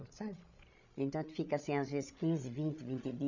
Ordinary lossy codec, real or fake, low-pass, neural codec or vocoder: none; fake; 7.2 kHz; codec, 16 kHz, 16 kbps, FreqCodec, larger model